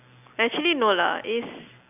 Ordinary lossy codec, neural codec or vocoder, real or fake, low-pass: none; none; real; 3.6 kHz